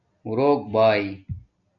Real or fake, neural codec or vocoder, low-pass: real; none; 7.2 kHz